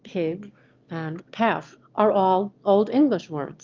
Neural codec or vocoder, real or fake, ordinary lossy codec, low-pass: autoencoder, 22.05 kHz, a latent of 192 numbers a frame, VITS, trained on one speaker; fake; Opus, 32 kbps; 7.2 kHz